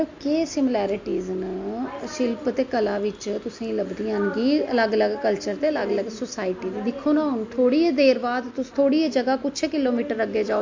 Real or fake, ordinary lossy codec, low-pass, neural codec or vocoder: real; MP3, 48 kbps; 7.2 kHz; none